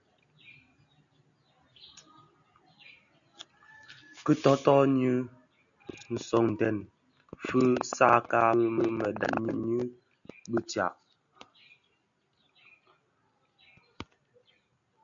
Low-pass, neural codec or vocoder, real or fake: 7.2 kHz; none; real